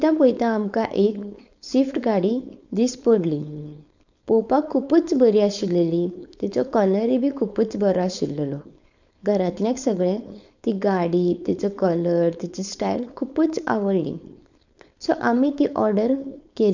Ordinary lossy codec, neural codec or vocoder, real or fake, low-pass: none; codec, 16 kHz, 4.8 kbps, FACodec; fake; 7.2 kHz